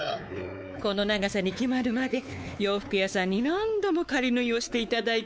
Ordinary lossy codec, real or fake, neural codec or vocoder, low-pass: none; fake; codec, 16 kHz, 4 kbps, X-Codec, WavLM features, trained on Multilingual LibriSpeech; none